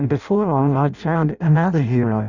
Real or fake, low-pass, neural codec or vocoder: fake; 7.2 kHz; codec, 16 kHz in and 24 kHz out, 0.6 kbps, FireRedTTS-2 codec